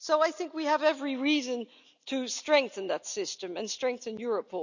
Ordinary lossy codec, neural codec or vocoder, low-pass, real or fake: none; none; 7.2 kHz; real